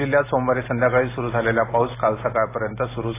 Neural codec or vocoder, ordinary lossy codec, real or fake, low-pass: none; AAC, 16 kbps; real; 3.6 kHz